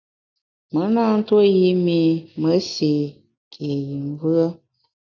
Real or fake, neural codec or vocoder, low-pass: real; none; 7.2 kHz